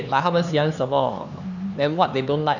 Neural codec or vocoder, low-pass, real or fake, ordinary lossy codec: codec, 16 kHz, 4 kbps, X-Codec, HuBERT features, trained on LibriSpeech; 7.2 kHz; fake; none